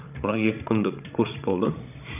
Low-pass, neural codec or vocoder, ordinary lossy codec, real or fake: 3.6 kHz; codec, 16 kHz, 16 kbps, FreqCodec, larger model; none; fake